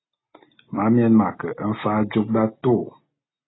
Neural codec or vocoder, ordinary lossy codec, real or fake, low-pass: none; AAC, 16 kbps; real; 7.2 kHz